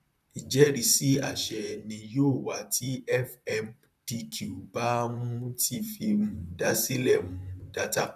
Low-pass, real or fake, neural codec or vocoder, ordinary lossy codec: 14.4 kHz; fake; vocoder, 44.1 kHz, 128 mel bands, Pupu-Vocoder; none